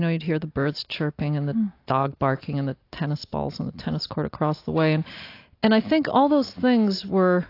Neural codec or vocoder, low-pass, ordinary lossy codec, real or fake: none; 5.4 kHz; AAC, 32 kbps; real